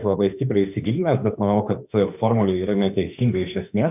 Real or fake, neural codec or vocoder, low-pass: fake; autoencoder, 48 kHz, 32 numbers a frame, DAC-VAE, trained on Japanese speech; 3.6 kHz